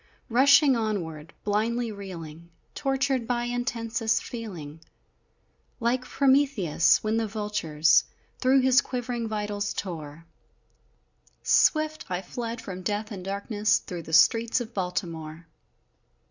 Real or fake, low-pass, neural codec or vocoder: real; 7.2 kHz; none